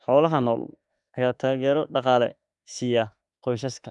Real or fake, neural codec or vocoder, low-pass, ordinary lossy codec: fake; autoencoder, 48 kHz, 32 numbers a frame, DAC-VAE, trained on Japanese speech; 10.8 kHz; none